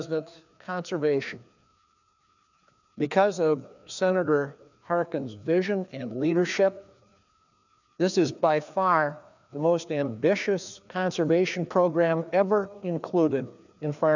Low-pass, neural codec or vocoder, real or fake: 7.2 kHz; codec, 16 kHz, 2 kbps, FreqCodec, larger model; fake